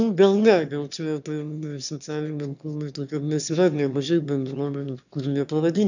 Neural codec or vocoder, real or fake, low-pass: autoencoder, 22.05 kHz, a latent of 192 numbers a frame, VITS, trained on one speaker; fake; 7.2 kHz